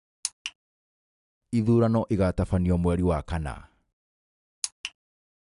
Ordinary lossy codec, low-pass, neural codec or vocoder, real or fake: none; 10.8 kHz; none; real